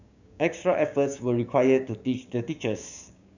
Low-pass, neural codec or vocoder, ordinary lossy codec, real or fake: 7.2 kHz; codec, 16 kHz, 6 kbps, DAC; none; fake